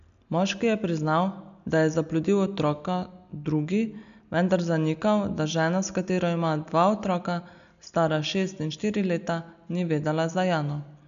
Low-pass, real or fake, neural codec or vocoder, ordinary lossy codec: 7.2 kHz; real; none; AAC, 96 kbps